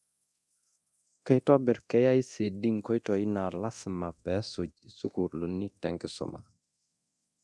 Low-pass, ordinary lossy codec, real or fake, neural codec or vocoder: none; none; fake; codec, 24 kHz, 0.9 kbps, DualCodec